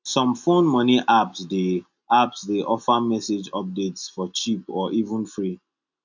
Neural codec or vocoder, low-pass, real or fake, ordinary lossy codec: none; 7.2 kHz; real; none